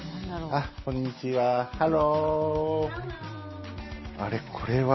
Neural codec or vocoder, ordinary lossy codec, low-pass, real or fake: none; MP3, 24 kbps; 7.2 kHz; real